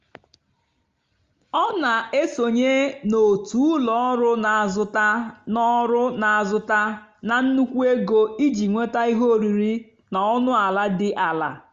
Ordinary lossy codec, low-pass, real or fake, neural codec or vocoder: Opus, 32 kbps; 7.2 kHz; real; none